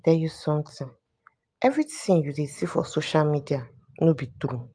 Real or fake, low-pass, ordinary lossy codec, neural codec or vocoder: real; 9.9 kHz; AAC, 64 kbps; none